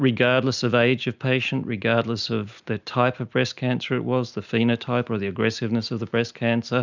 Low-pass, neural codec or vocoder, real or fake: 7.2 kHz; none; real